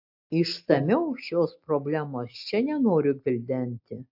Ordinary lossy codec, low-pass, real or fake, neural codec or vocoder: AAC, 48 kbps; 5.4 kHz; real; none